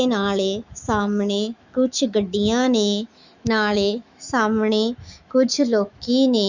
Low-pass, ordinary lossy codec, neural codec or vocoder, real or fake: 7.2 kHz; Opus, 64 kbps; autoencoder, 48 kHz, 128 numbers a frame, DAC-VAE, trained on Japanese speech; fake